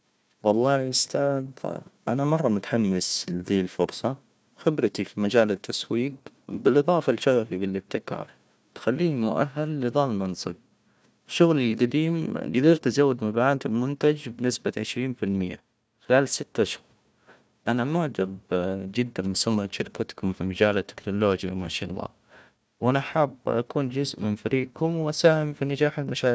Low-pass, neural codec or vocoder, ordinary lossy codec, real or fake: none; codec, 16 kHz, 1 kbps, FunCodec, trained on Chinese and English, 50 frames a second; none; fake